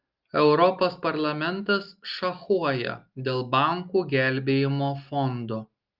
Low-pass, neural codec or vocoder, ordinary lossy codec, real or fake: 5.4 kHz; none; Opus, 32 kbps; real